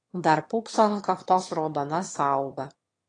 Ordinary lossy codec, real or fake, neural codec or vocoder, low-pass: AAC, 32 kbps; fake; autoencoder, 22.05 kHz, a latent of 192 numbers a frame, VITS, trained on one speaker; 9.9 kHz